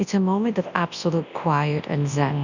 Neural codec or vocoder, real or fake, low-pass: codec, 24 kHz, 0.9 kbps, WavTokenizer, large speech release; fake; 7.2 kHz